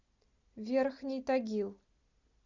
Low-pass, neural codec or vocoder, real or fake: 7.2 kHz; none; real